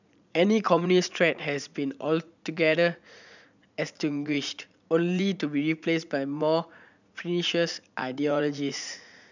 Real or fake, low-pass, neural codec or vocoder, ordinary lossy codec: fake; 7.2 kHz; vocoder, 44.1 kHz, 80 mel bands, Vocos; none